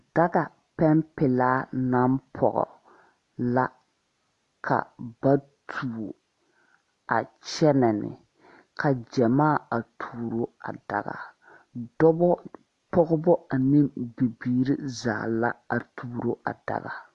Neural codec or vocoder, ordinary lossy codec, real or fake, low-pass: none; AAC, 48 kbps; real; 10.8 kHz